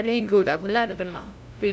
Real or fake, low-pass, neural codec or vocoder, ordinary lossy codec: fake; none; codec, 16 kHz, 0.5 kbps, FunCodec, trained on LibriTTS, 25 frames a second; none